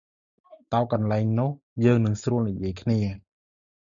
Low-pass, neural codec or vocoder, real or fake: 7.2 kHz; none; real